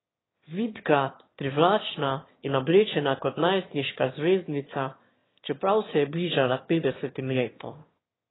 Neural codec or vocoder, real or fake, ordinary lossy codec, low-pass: autoencoder, 22.05 kHz, a latent of 192 numbers a frame, VITS, trained on one speaker; fake; AAC, 16 kbps; 7.2 kHz